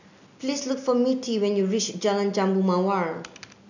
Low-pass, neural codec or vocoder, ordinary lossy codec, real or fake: 7.2 kHz; none; none; real